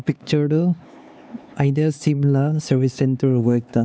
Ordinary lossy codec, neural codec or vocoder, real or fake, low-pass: none; codec, 16 kHz, 4 kbps, X-Codec, HuBERT features, trained on LibriSpeech; fake; none